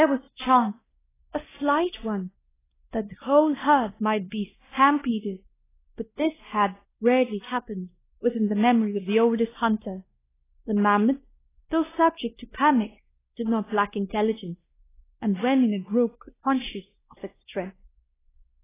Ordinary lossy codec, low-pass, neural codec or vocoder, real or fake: AAC, 16 kbps; 3.6 kHz; codec, 16 kHz, 1 kbps, X-Codec, WavLM features, trained on Multilingual LibriSpeech; fake